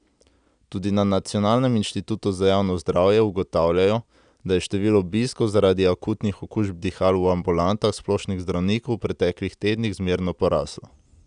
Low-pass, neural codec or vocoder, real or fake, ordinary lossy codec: 9.9 kHz; none; real; none